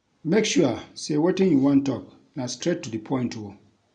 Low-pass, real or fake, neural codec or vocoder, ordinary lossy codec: 9.9 kHz; real; none; none